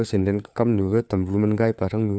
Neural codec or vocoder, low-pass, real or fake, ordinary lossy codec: codec, 16 kHz, 4 kbps, FunCodec, trained on LibriTTS, 50 frames a second; none; fake; none